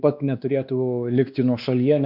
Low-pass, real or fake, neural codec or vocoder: 5.4 kHz; fake; codec, 16 kHz, 2 kbps, X-Codec, WavLM features, trained on Multilingual LibriSpeech